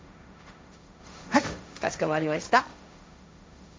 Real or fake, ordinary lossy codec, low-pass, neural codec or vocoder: fake; none; none; codec, 16 kHz, 1.1 kbps, Voila-Tokenizer